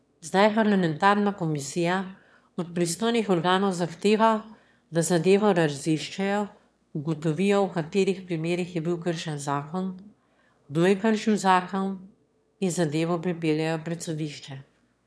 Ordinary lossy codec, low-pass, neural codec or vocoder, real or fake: none; none; autoencoder, 22.05 kHz, a latent of 192 numbers a frame, VITS, trained on one speaker; fake